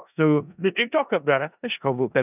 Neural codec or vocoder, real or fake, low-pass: codec, 16 kHz in and 24 kHz out, 0.4 kbps, LongCat-Audio-Codec, four codebook decoder; fake; 3.6 kHz